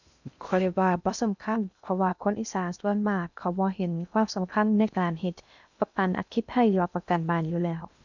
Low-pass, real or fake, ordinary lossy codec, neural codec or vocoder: 7.2 kHz; fake; none; codec, 16 kHz in and 24 kHz out, 0.6 kbps, FocalCodec, streaming, 2048 codes